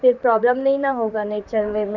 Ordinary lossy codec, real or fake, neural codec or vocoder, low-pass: none; fake; vocoder, 44.1 kHz, 128 mel bands, Pupu-Vocoder; 7.2 kHz